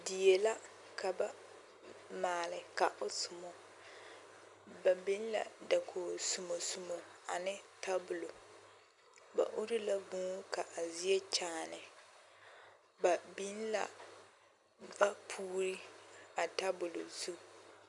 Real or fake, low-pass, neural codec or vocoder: real; 10.8 kHz; none